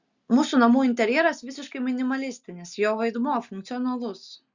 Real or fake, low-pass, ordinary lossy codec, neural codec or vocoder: real; 7.2 kHz; Opus, 64 kbps; none